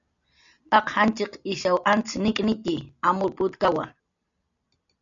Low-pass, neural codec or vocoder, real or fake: 7.2 kHz; none; real